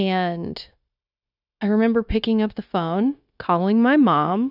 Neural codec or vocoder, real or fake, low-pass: codec, 16 kHz, 0.9 kbps, LongCat-Audio-Codec; fake; 5.4 kHz